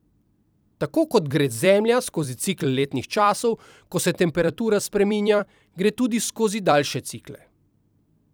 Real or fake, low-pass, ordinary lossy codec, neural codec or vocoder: fake; none; none; vocoder, 44.1 kHz, 128 mel bands every 256 samples, BigVGAN v2